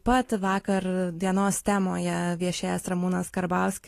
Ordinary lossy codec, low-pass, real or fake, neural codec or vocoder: AAC, 48 kbps; 14.4 kHz; real; none